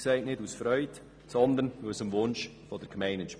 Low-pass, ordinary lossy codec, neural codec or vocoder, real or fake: none; none; none; real